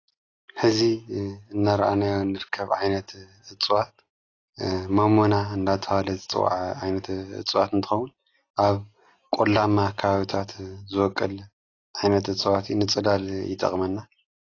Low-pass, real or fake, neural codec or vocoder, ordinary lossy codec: 7.2 kHz; real; none; AAC, 32 kbps